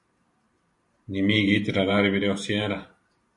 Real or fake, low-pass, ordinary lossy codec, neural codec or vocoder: fake; 10.8 kHz; MP3, 48 kbps; vocoder, 44.1 kHz, 128 mel bands every 512 samples, BigVGAN v2